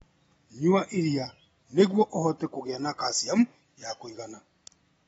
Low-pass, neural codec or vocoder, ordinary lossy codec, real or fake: 19.8 kHz; none; AAC, 24 kbps; real